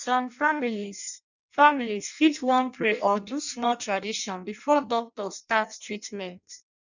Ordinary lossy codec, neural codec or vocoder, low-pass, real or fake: none; codec, 16 kHz in and 24 kHz out, 0.6 kbps, FireRedTTS-2 codec; 7.2 kHz; fake